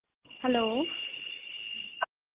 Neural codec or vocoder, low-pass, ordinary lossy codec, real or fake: none; 3.6 kHz; Opus, 16 kbps; real